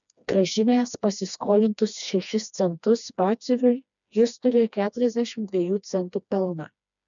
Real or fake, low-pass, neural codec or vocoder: fake; 7.2 kHz; codec, 16 kHz, 2 kbps, FreqCodec, smaller model